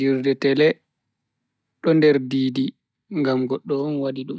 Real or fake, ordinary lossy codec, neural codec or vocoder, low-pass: real; none; none; none